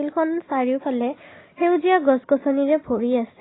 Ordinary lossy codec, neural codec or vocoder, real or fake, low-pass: AAC, 16 kbps; none; real; 7.2 kHz